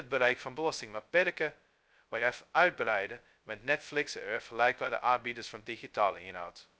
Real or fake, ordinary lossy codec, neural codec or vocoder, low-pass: fake; none; codec, 16 kHz, 0.2 kbps, FocalCodec; none